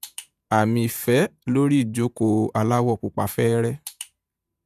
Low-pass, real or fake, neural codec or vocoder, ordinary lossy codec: 14.4 kHz; fake; vocoder, 48 kHz, 128 mel bands, Vocos; none